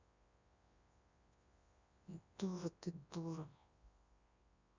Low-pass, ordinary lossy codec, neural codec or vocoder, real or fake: 7.2 kHz; Opus, 64 kbps; codec, 24 kHz, 0.9 kbps, WavTokenizer, large speech release; fake